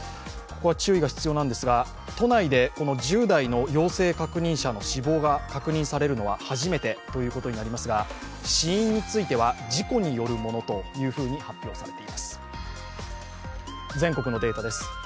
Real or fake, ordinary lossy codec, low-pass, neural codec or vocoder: real; none; none; none